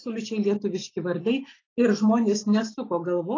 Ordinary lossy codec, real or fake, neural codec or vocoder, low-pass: AAC, 32 kbps; real; none; 7.2 kHz